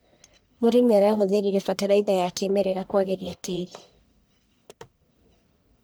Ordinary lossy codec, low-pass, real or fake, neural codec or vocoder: none; none; fake; codec, 44.1 kHz, 1.7 kbps, Pupu-Codec